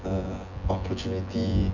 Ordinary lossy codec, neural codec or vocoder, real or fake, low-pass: none; vocoder, 24 kHz, 100 mel bands, Vocos; fake; 7.2 kHz